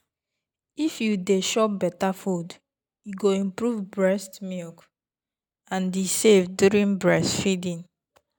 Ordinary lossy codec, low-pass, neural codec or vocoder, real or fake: none; none; none; real